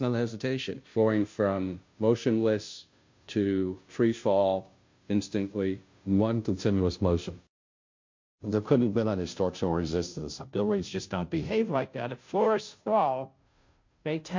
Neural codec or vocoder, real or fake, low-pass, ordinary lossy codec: codec, 16 kHz, 0.5 kbps, FunCodec, trained on Chinese and English, 25 frames a second; fake; 7.2 kHz; MP3, 48 kbps